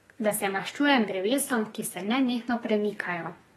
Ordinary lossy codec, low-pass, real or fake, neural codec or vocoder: AAC, 32 kbps; 14.4 kHz; fake; codec, 32 kHz, 1.9 kbps, SNAC